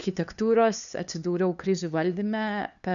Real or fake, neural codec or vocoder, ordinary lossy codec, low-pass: fake; codec, 16 kHz, 2 kbps, FunCodec, trained on LibriTTS, 25 frames a second; MP3, 96 kbps; 7.2 kHz